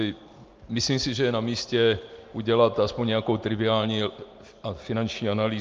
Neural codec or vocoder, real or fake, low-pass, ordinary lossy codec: none; real; 7.2 kHz; Opus, 24 kbps